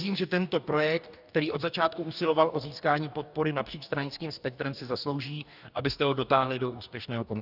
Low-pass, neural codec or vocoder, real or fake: 5.4 kHz; codec, 44.1 kHz, 2.6 kbps, DAC; fake